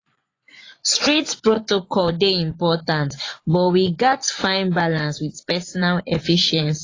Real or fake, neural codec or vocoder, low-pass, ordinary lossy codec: fake; vocoder, 24 kHz, 100 mel bands, Vocos; 7.2 kHz; AAC, 32 kbps